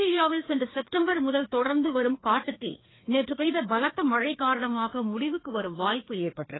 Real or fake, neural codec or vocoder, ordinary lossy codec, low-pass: fake; codec, 16 kHz, 2 kbps, FreqCodec, larger model; AAC, 16 kbps; 7.2 kHz